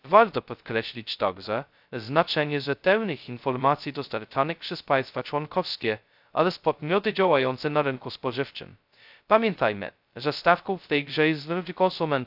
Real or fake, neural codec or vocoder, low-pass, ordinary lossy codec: fake; codec, 16 kHz, 0.2 kbps, FocalCodec; 5.4 kHz; AAC, 48 kbps